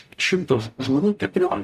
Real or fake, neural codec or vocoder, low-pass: fake; codec, 44.1 kHz, 0.9 kbps, DAC; 14.4 kHz